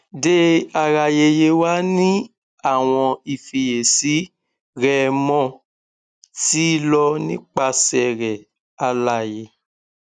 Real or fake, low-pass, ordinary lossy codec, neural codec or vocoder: real; 9.9 kHz; none; none